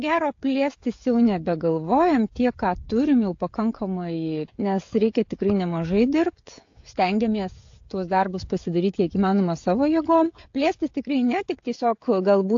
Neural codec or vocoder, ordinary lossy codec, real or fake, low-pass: codec, 16 kHz, 16 kbps, FreqCodec, smaller model; AAC, 48 kbps; fake; 7.2 kHz